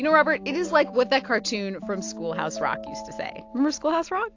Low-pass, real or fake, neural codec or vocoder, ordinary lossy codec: 7.2 kHz; real; none; AAC, 48 kbps